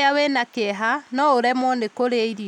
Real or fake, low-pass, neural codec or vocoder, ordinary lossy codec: real; 19.8 kHz; none; none